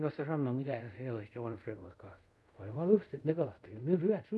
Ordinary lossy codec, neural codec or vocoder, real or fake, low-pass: none; codec, 24 kHz, 0.5 kbps, DualCodec; fake; none